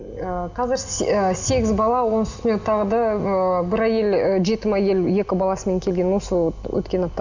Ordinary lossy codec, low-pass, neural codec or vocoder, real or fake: none; 7.2 kHz; none; real